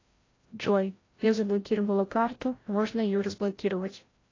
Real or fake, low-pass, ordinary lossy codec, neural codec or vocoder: fake; 7.2 kHz; AAC, 32 kbps; codec, 16 kHz, 0.5 kbps, FreqCodec, larger model